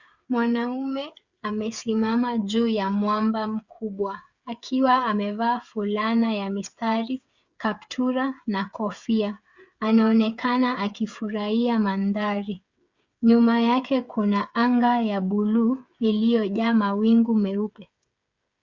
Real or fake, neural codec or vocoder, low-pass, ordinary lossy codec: fake; codec, 16 kHz, 8 kbps, FreqCodec, smaller model; 7.2 kHz; Opus, 64 kbps